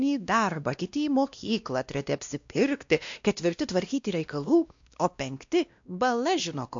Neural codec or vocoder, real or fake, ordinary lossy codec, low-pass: codec, 16 kHz, 1 kbps, X-Codec, WavLM features, trained on Multilingual LibriSpeech; fake; AAC, 64 kbps; 7.2 kHz